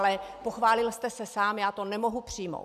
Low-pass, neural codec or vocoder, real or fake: 14.4 kHz; none; real